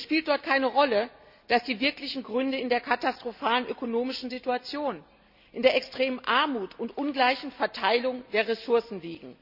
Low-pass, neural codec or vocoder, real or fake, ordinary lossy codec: 5.4 kHz; none; real; none